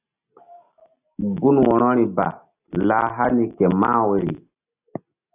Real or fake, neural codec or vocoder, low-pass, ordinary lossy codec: real; none; 3.6 kHz; AAC, 32 kbps